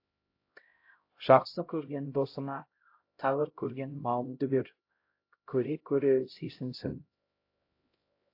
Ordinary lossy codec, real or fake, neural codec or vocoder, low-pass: AAC, 32 kbps; fake; codec, 16 kHz, 0.5 kbps, X-Codec, HuBERT features, trained on LibriSpeech; 5.4 kHz